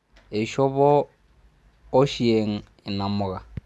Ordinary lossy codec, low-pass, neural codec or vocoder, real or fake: none; none; none; real